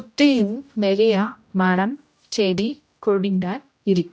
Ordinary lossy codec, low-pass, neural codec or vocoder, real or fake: none; none; codec, 16 kHz, 0.5 kbps, X-Codec, HuBERT features, trained on general audio; fake